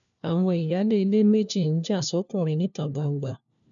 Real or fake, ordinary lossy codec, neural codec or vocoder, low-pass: fake; none; codec, 16 kHz, 1 kbps, FunCodec, trained on LibriTTS, 50 frames a second; 7.2 kHz